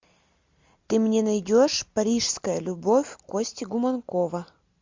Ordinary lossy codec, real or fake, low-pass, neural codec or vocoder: MP3, 64 kbps; real; 7.2 kHz; none